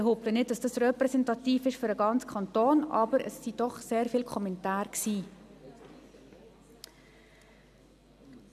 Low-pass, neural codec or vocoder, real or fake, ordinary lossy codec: 14.4 kHz; none; real; none